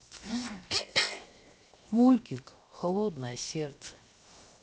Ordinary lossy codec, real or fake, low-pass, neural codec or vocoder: none; fake; none; codec, 16 kHz, 0.7 kbps, FocalCodec